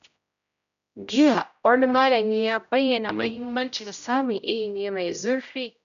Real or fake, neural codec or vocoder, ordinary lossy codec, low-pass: fake; codec, 16 kHz, 0.5 kbps, X-Codec, HuBERT features, trained on general audio; none; 7.2 kHz